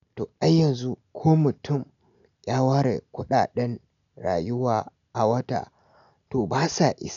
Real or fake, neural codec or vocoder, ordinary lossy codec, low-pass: real; none; none; 7.2 kHz